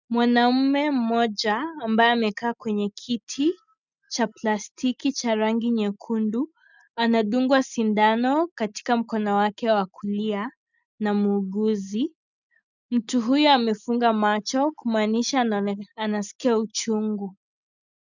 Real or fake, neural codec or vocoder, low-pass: real; none; 7.2 kHz